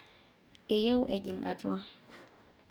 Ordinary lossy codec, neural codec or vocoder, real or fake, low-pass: none; codec, 44.1 kHz, 2.6 kbps, DAC; fake; none